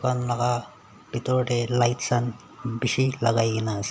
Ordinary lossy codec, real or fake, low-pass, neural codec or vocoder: none; real; none; none